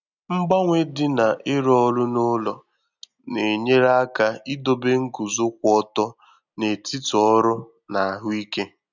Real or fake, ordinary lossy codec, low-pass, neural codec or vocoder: real; none; 7.2 kHz; none